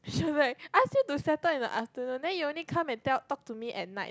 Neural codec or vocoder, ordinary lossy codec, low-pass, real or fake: none; none; none; real